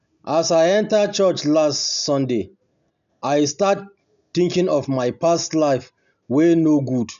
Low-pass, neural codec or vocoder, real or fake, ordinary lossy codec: 7.2 kHz; none; real; none